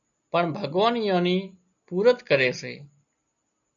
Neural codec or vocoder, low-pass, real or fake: none; 7.2 kHz; real